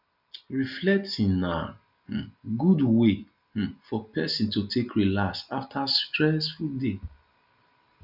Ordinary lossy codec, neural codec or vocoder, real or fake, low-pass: none; none; real; 5.4 kHz